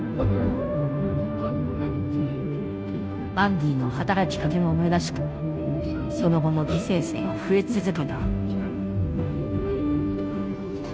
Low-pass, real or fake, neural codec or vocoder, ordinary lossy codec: none; fake; codec, 16 kHz, 0.5 kbps, FunCodec, trained on Chinese and English, 25 frames a second; none